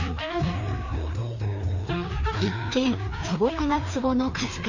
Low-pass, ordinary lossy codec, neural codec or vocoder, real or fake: 7.2 kHz; none; codec, 16 kHz, 2 kbps, FreqCodec, larger model; fake